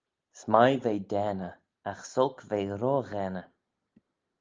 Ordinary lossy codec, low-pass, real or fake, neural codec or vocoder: Opus, 24 kbps; 7.2 kHz; real; none